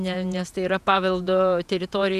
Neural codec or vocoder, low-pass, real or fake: vocoder, 48 kHz, 128 mel bands, Vocos; 14.4 kHz; fake